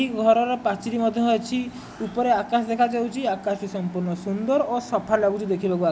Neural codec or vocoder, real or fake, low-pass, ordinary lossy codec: none; real; none; none